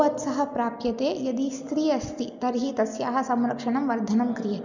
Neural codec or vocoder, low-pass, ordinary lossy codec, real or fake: none; 7.2 kHz; none; real